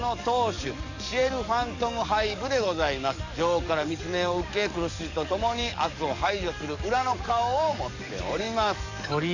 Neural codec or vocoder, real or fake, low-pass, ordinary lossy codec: codec, 44.1 kHz, 7.8 kbps, DAC; fake; 7.2 kHz; none